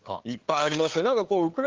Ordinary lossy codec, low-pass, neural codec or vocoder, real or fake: Opus, 16 kbps; 7.2 kHz; codec, 16 kHz, 2 kbps, FunCodec, trained on LibriTTS, 25 frames a second; fake